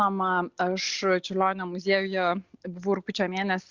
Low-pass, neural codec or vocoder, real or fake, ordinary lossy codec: 7.2 kHz; none; real; Opus, 64 kbps